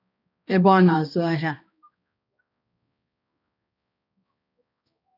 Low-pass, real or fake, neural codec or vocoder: 5.4 kHz; fake; codec, 16 kHz, 1 kbps, X-Codec, HuBERT features, trained on balanced general audio